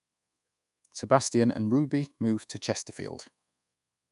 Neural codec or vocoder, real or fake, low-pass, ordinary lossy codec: codec, 24 kHz, 1.2 kbps, DualCodec; fake; 10.8 kHz; none